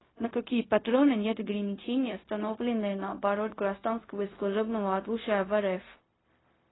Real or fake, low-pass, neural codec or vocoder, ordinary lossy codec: fake; 7.2 kHz; codec, 16 kHz, 0.4 kbps, LongCat-Audio-Codec; AAC, 16 kbps